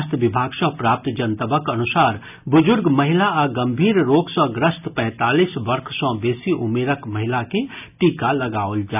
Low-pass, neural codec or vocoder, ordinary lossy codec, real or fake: 3.6 kHz; none; none; real